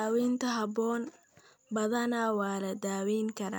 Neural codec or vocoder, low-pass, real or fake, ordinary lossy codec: none; none; real; none